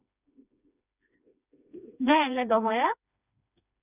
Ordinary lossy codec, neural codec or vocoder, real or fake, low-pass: none; codec, 16 kHz, 2 kbps, FreqCodec, smaller model; fake; 3.6 kHz